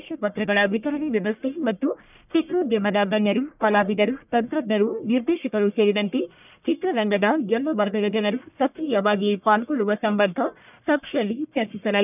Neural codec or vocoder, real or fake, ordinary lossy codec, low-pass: codec, 44.1 kHz, 1.7 kbps, Pupu-Codec; fake; none; 3.6 kHz